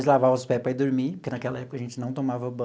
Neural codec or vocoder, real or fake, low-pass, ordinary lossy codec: none; real; none; none